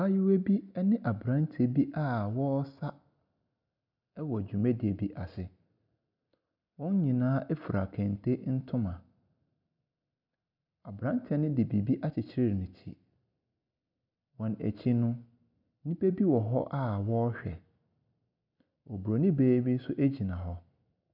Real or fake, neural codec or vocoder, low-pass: real; none; 5.4 kHz